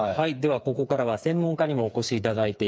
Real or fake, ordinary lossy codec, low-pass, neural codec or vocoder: fake; none; none; codec, 16 kHz, 4 kbps, FreqCodec, smaller model